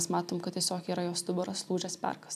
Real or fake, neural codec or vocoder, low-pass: real; none; 14.4 kHz